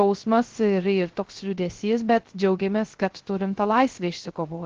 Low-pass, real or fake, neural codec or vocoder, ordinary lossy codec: 7.2 kHz; fake; codec, 16 kHz, 0.3 kbps, FocalCodec; Opus, 16 kbps